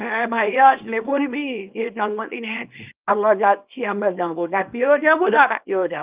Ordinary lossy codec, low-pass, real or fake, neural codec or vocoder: Opus, 24 kbps; 3.6 kHz; fake; codec, 24 kHz, 0.9 kbps, WavTokenizer, small release